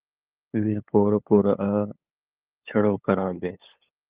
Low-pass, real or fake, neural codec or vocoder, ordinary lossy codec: 3.6 kHz; fake; codec, 16 kHz, 8 kbps, FunCodec, trained on LibriTTS, 25 frames a second; Opus, 24 kbps